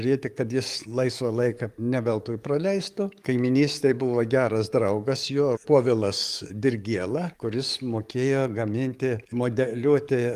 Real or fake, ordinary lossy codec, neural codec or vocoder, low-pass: fake; Opus, 32 kbps; codec, 44.1 kHz, 7.8 kbps, DAC; 14.4 kHz